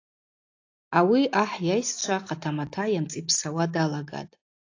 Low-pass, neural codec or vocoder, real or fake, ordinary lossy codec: 7.2 kHz; none; real; AAC, 48 kbps